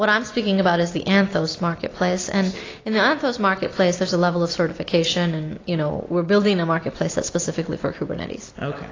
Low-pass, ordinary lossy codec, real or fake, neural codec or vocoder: 7.2 kHz; AAC, 32 kbps; real; none